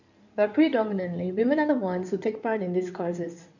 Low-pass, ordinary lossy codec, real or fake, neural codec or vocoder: 7.2 kHz; none; fake; codec, 16 kHz in and 24 kHz out, 2.2 kbps, FireRedTTS-2 codec